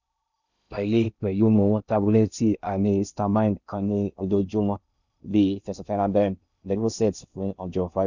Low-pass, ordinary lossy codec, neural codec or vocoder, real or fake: 7.2 kHz; none; codec, 16 kHz in and 24 kHz out, 0.6 kbps, FocalCodec, streaming, 2048 codes; fake